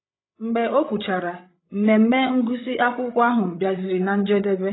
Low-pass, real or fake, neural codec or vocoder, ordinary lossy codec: 7.2 kHz; fake; codec, 16 kHz, 16 kbps, FreqCodec, larger model; AAC, 16 kbps